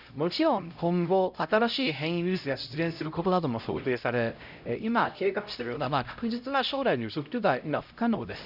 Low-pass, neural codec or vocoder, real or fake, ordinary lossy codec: 5.4 kHz; codec, 16 kHz, 0.5 kbps, X-Codec, HuBERT features, trained on LibriSpeech; fake; none